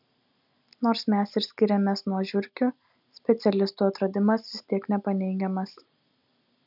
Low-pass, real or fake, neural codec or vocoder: 5.4 kHz; real; none